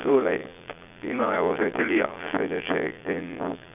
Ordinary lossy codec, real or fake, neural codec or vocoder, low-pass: none; fake; vocoder, 22.05 kHz, 80 mel bands, Vocos; 3.6 kHz